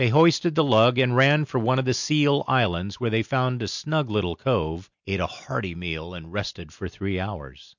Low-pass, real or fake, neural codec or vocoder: 7.2 kHz; real; none